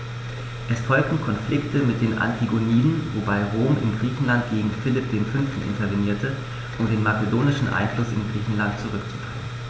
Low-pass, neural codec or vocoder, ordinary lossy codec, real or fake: none; none; none; real